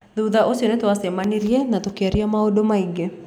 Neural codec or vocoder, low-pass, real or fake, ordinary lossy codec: none; 19.8 kHz; real; none